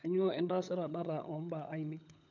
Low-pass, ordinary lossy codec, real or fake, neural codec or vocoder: 7.2 kHz; none; fake; codec, 16 kHz, 16 kbps, FunCodec, trained on LibriTTS, 50 frames a second